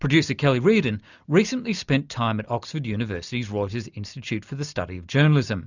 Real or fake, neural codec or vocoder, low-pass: real; none; 7.2 kHz